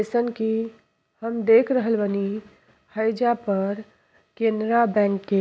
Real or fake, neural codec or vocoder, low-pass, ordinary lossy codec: real; none; none; none